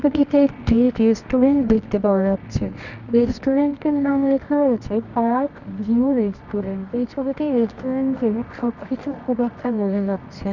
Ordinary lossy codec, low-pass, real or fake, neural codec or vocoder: none; 7.2 kHz; fake; codec, 24 kHz, 0.9 kbps, WavTokenizer, medium music audio release